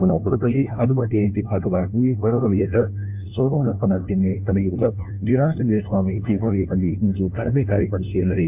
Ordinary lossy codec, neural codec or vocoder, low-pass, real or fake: MP3, 32 kbps; codec, 16 kHz, 1 kbps, FunCodec, trained on LibriTTS, 50 frames a second; 3.6 kHz; fake